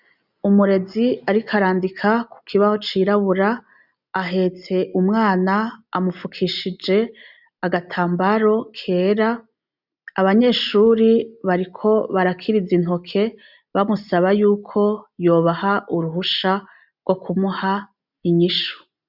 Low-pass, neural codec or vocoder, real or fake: 5.4 kHz; none; real